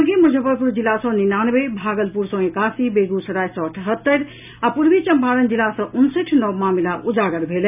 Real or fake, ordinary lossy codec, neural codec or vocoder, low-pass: real; none; none; 3.6 kHz